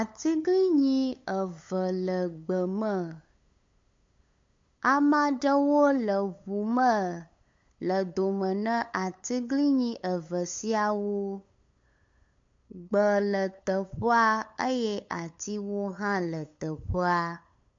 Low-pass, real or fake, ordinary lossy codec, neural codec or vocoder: 7.2 kHz; fake; MP3, 48 kbps; codec, 16 kHz, 8 kbps, FunCodec, trained on LibriTTS, 25 frames a second